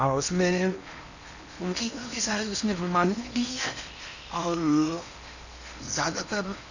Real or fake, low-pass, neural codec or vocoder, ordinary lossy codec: fake; 7.2 kHz; codec, 16 kHz in and 24 kHz out, 0.8 kbps, FocalCodec, streaming, 65536 codes; none